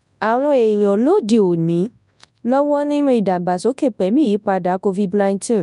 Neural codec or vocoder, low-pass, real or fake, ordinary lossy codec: codec, 24 kHz, 0.9 kbps, WavTokenizer, large speech release; 10.8 kHz; fake; none